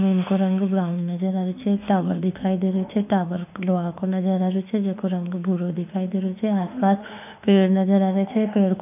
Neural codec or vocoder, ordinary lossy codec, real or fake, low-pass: autoencoder, 48 kHz, 32 numbers a frame, DAC-VAE, trained on Japanese speech; none; fake; 3.6 kHz